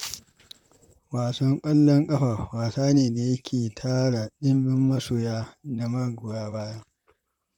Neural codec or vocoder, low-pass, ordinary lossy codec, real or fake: vocoder, 44.1 kHz, 128 mel bands, Pupu-Vocoder; 19.8 kHz; none; fake